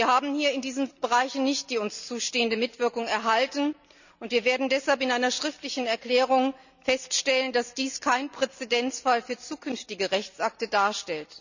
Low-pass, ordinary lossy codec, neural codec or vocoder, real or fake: 7.2 kHz; none; none; real